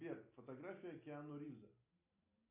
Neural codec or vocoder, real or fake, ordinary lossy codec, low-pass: none; real; AAC, 32 kbps; 3.6 kHz